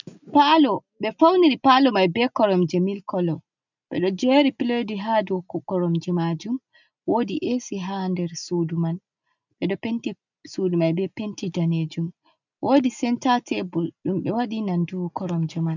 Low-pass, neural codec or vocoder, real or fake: 7.2 kHz; none; real